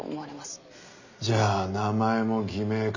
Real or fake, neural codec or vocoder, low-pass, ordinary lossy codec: real; none; 7.2 kHz; none